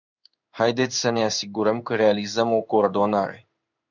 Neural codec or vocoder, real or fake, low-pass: codec, 16 kHz in and 24 kHz out, 1 kbps, XY-Tokenizer; fake; 7.2 kHz